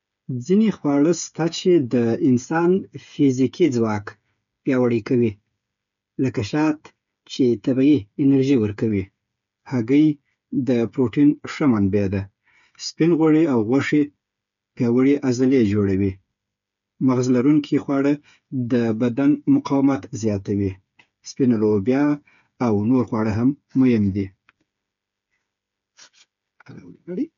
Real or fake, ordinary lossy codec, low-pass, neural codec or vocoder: fake; none; 7.2 kHz; codec, 16 kHz, 8 kbps, FreqCodec, smaller model